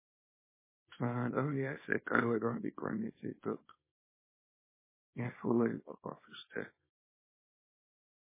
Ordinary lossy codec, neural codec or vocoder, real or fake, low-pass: MP3, 16 kbps; codec, 24 kHz, 0.9 kbps, WavTokenizer, small release; fake; 3.6 kHz